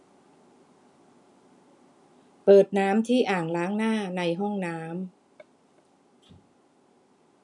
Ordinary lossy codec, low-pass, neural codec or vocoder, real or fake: none; 10.8 kHz; none; real